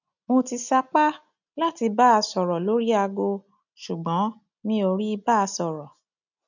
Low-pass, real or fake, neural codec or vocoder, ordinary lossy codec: 7.2 kHz; real; none; none